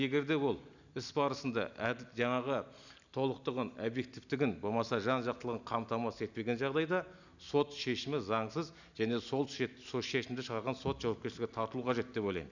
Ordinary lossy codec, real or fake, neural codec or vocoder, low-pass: none; real; none; 7.2 kHz